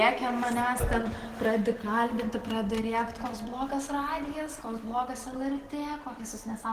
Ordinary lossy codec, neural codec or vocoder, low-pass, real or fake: Opus, 16 kbps; none; 14.4 kHz; real